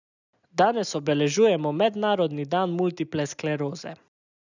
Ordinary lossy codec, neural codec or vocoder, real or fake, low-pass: none; none; real; 7.2 kHz